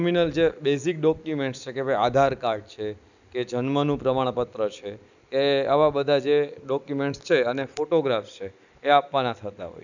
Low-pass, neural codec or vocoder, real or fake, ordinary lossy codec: 7.2 kHz; none; real; none